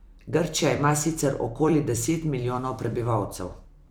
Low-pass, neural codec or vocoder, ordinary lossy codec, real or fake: none; vocoder, 44.1 kHz, 128 mel bands every 256 samples, BigVGAN v2; none; fake